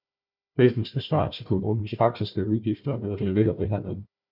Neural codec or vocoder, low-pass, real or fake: codec, 16 kHz, 1 kbps, FunCodec, trained on Chinese and English, 50 frames a second; 5.4 kHz; fake